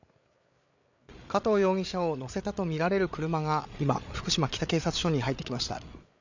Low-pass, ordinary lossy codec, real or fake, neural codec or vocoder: 7.2 kHz; none; fake; codec, 16 kHz, 8 kbps, FreqCodec, larger model